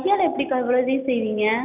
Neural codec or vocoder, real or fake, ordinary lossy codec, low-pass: none; real; none; 3.6 kHz